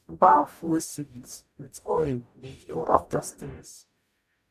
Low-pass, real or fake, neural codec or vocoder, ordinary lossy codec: 14.4 kHz; fake; codec, 44.1 kHz, 0.9 kbps, DAC; none